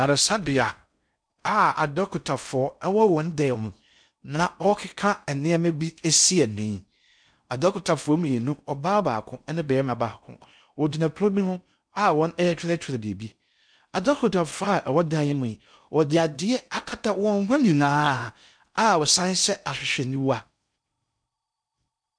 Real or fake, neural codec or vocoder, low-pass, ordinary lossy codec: fake; codec, 16 kHz in and 24 kHz out, 0.6 kbps, FocalCodec, streaming, 2048 codes; 9.9 kHz; MP3, 96 kbps